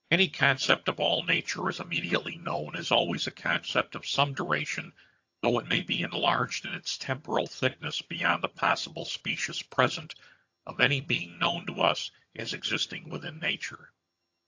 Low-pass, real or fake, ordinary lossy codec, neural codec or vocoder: 7.2 kHz; fake; AAC, 48 kbps; vocoder, 22.05 kHz, 80 mel bands, HiFi-GAN